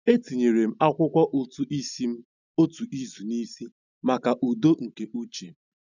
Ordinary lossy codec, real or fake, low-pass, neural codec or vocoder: none; real; 7.2 kHz; none